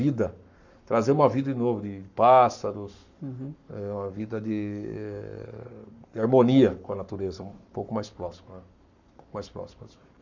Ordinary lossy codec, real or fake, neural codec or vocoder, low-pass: none; fake; codec, 44.1 kHz, 7.8 kbps, Pupu-Codec; 7.2 kHz